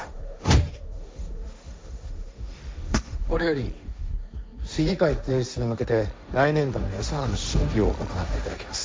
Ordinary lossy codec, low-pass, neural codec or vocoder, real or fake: none; none; codec, 16 kHz, 1.1 kbps, Voila-Tokenizer; fake